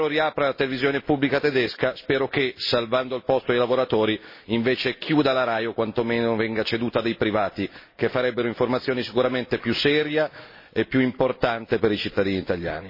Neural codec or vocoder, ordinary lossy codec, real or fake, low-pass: none; MP3, 24 kbps; real; 5.4 kHz